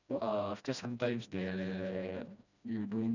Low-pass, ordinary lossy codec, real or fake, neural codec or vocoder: 7.2 kHz; none; fake; codec, 16 kHz, 1 kbps, FreqCodec, smaller model